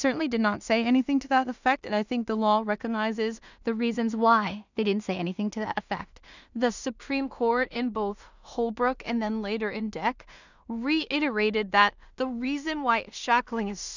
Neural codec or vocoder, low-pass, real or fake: codec, 16 kHz in and 24 kHz out, 0.4 kbps, LongCat-Audio-Codec, two codebook decoder; 7.2 kHz; fake